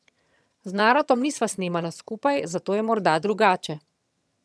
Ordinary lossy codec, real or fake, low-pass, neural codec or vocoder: none; fake; none; vocoder, 22.05 kHz, 80 mel bands, HiFi-GAN